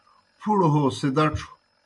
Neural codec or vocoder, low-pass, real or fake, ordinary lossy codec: none; 10.8 kHz; real; MP3, 96 kbps